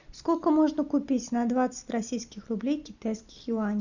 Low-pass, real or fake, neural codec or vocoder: 7.2 kHz; real; none